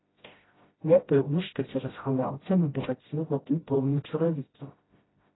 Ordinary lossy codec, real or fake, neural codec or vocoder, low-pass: AAC, 16 kbps; fake; codec, 16 kHz, 0.5 kbps, FreqCodec, smaller model; 7.2 kHz